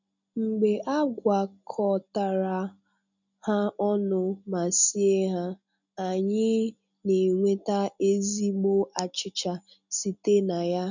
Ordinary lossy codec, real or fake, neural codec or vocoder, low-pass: none; real; none; 7.2 kHz